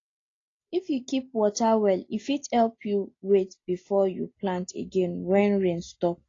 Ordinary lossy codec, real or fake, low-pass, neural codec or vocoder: AAC, 32 kbps; real; 7.2 kHz; none